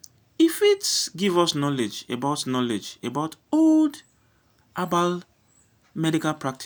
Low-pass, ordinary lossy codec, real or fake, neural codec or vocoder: none; none; real; none